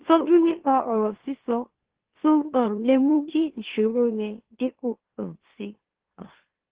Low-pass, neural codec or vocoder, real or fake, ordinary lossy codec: 3.6 kHz; autoencoder, 44.1 kHz, a latent of 192 numbers a frame, MeloTTS; fake; Opus, 16 kbps